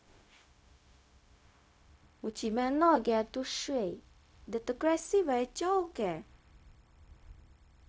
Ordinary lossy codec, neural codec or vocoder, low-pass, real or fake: none; codec, 16 kHz, 0.4 kbps, LongCat-Audio-Codec; none; fake